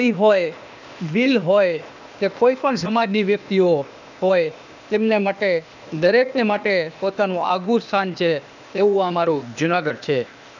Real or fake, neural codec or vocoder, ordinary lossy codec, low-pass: fake; codec, 16 kHz, 0.8 kbps, ZipCodec; none; 7.2 kHz